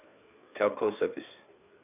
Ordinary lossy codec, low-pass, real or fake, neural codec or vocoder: none; 3.6 kHz; fake; codec, 16 kHz, 4 kbps, FreqCodec, larger model